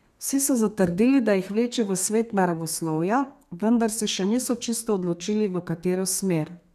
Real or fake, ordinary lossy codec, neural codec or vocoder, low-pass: fake; none; codec, 32 kHz, 1.9 kbps, SNAC; 14.4 kHz